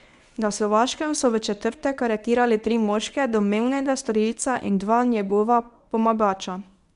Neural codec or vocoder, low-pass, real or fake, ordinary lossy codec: codec, 24 kHz, 0.9 kbps, WavTokenizer, medium speech release version 1; 10.8 kHz; fake; none